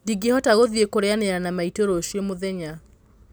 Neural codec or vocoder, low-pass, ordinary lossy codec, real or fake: none; none; none; real